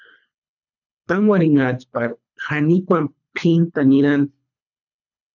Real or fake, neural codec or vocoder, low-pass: fake; codec, 24 kHz, 3 kbps, HILCodec; 7.2 kHz